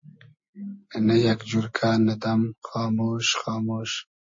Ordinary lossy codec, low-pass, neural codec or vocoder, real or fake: MP3, 32 kbps; 7.2 kHz; none; real